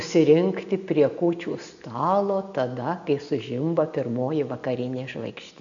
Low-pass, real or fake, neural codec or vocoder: 7.2 kHz; real; none